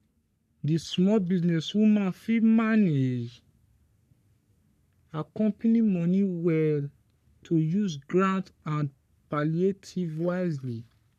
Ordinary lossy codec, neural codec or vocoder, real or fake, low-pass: none; codec, 44.1 kHz, 3.4 kbps, Pupu-Codec; fake; 14.4 kHz